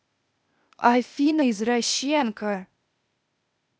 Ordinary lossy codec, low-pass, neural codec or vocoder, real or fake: none; none; codec, 16 kHz, 0.8 kbps, ZipCodec; fake